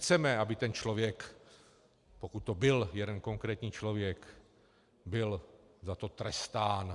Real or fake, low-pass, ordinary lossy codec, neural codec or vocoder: real; 10.8 kHz; Opus, 64 kbps; none